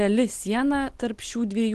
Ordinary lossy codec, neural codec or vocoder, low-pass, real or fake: Opus, 16 kbps; none; 10.8 kHz; real